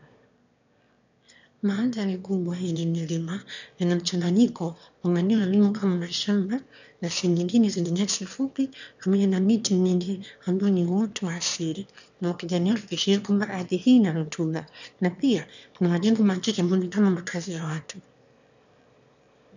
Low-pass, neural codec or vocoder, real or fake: 7.2 kHz; autoencoder, 22.05 kHz, a latent of 192 numbers a frame, VITS, trained on one speaker; fake